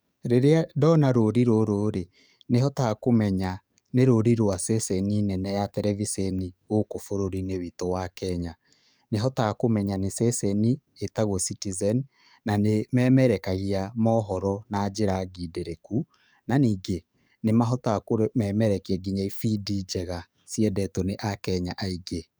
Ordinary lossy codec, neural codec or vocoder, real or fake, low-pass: none; codec, 44.1 kHz, 7.8 kbps, DAC; fake; none